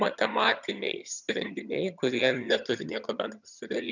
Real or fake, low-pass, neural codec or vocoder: fake; 7.2 kHz; vocoder, 22.05 kHz, 80 mel bands, HiFi-GAN